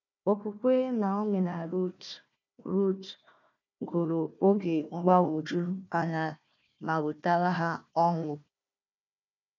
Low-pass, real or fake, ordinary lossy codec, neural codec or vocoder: 7.2 kHz; fake; none; codec, 16 kHz, 1 kbps, FunCodec, trained on Chinese and English, 50 frames a second